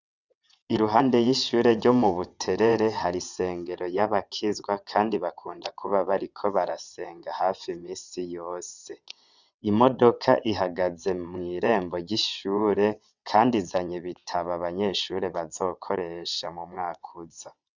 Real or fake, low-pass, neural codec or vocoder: fake; 7.2 kHz; vocoder, 22.05 kHz, 80 mel bands, WaveNeXt